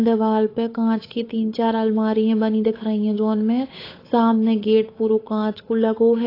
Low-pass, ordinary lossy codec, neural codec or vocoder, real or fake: 5.4 kHz; AAC, 24 kbps; codec, 16 kHz, 8 kbps, FunCodec, trained on Chinese and English, 25 frames a second; fake